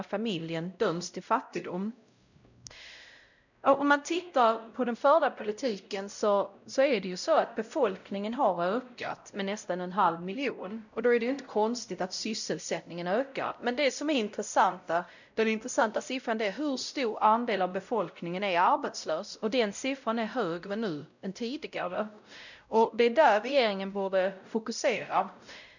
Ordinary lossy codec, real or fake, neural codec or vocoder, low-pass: none; fake; codec, 16 kHz, 0.5 kbps, X-Codec, WavLM features, trained on Multilingual LibriSpeech; 7.2 kHz